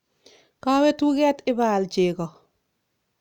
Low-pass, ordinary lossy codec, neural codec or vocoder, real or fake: 19.8 kHz; none; none; real